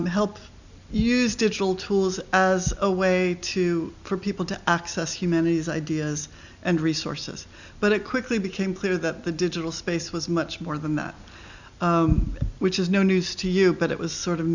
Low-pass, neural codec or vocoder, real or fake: 7.2 kHz; none; real